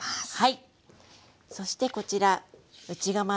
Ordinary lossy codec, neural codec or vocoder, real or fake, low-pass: none; none; real; none